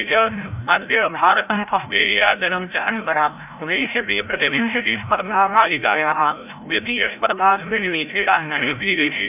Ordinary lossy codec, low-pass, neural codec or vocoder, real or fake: none; 3.6 kHz; codec, 16 kHz, 0.5 kbps, FreqCodec, larger model; fake